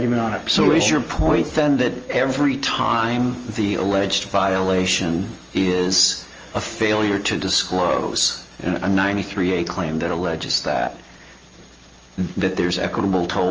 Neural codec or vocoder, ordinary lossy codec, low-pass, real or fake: none; Opus, 24 kbps; 7.2 kHz; real